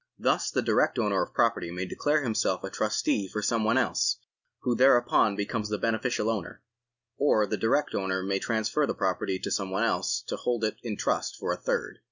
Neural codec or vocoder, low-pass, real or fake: none; 7.2 kHz; real